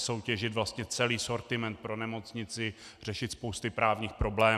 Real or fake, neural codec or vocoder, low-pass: real; none; 14.4 kHz